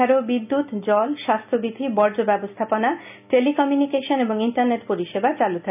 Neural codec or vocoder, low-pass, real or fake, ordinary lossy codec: none; 3.6 kHz; real; none